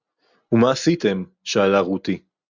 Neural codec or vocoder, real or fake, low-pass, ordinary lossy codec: none; real; 7.2 kHz; Opus, 64 kbps